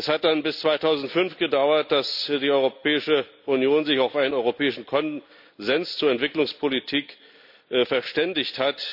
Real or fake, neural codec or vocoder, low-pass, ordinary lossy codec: real; none; 5.4 kHz; none